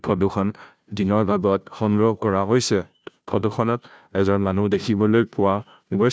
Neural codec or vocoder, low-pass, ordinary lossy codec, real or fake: codec, 16 kHz, 0.5 kbps, FunCodec, trained on Chinese and English, 25 frames a second; none; none; fake